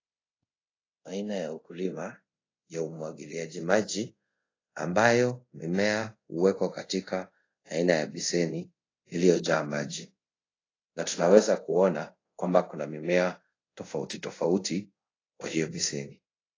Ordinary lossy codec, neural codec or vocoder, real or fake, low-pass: AAC, 32 kbps; codec, 24 kHz, 0.5 kbps, DualCodec; fake; 7.2 kHz